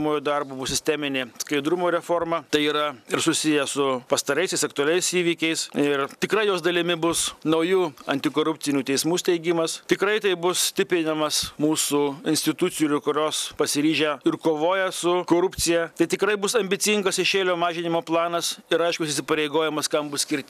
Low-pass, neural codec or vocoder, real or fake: 14.4 kHz; none; real